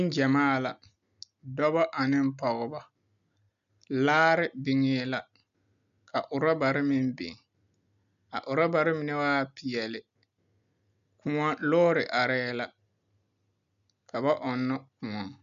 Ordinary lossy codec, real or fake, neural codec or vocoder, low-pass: MP3, 96 kbps; real; none; 7.2 kHz